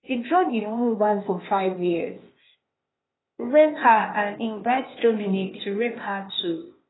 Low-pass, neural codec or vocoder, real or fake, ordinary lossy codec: 7.2 kHz; codec, 16 kHz, 0.8 kbps, ZipCodec; fake; AAC, 16 kbps